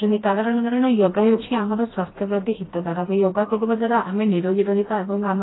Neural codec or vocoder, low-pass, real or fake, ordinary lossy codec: codec, 16 kHz, 1 kbps, FreqCodec, smaller model; 7.2 kHz; fake; AAC, 16 kbps